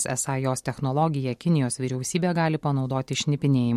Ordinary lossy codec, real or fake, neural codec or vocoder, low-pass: MP3, 64 kbps; real; none; 19.8 kHz